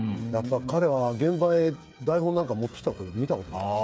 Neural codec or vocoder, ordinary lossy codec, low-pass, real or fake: codec, 16 kHz, 8 kbps, FreqCodec, smaller model; none; none; fake